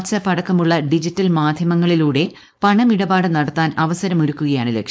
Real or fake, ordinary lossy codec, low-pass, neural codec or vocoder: fake; none; none; codec, 16 kHz, 4.8 kbps, FACodec